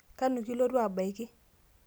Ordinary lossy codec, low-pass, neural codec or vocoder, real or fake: none; none; none; real